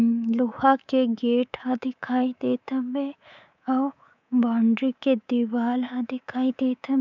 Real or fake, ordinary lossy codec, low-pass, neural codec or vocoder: fake; none; 7.2 kHz; codec, 24 kHz, 3.1 kbps, DualCodec